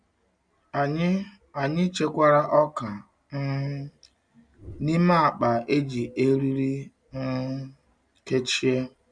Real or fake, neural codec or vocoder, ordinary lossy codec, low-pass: real; none; none; 9.9 kHz